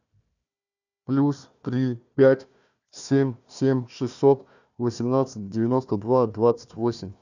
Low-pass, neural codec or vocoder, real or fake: 7.2 kHz; codec, 16 kHz, 1 kbps, FunCodec, trained on Chinese and English, 50 frames a second; fake